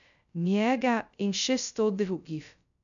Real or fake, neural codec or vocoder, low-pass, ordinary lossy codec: fake; codec, 16 kHz, 0.2 kbps, FocalCodec; 7.2 kHz; none